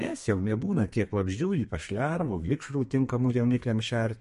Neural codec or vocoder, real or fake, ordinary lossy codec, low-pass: codec, 32 kHz, 1.9 kbps, SNAC; fake; MP3, 48 kbps; 14.4 kHz